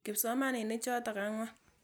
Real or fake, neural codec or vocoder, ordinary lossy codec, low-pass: real; none; none; none